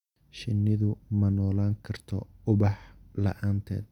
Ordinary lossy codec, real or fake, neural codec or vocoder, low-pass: none; real; none; 19.8 kHz